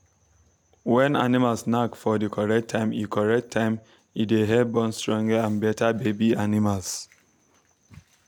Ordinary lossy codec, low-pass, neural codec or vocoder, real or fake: none; 19.8 kHz; none; real